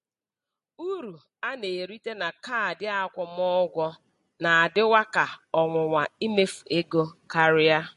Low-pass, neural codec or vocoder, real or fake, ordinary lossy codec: 14.4 kHz; none; real; MP3, 48 kbps